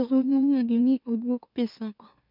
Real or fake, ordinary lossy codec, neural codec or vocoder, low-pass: fake; none; autoencoder, 44.1 kHz, a latent of 192 numbers a frame, MeloTTS; 5.4 kHz